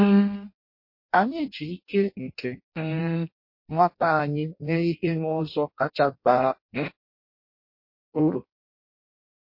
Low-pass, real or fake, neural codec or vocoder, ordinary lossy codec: 5.4 kHz; fake; codec, 16 kHz in and 24 kHz out, 0.6 kbps, FireRedTTS-2 codec; MP3, 32 kbps